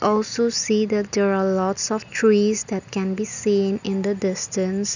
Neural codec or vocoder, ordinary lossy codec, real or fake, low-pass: none; none; real; 7.2 kHz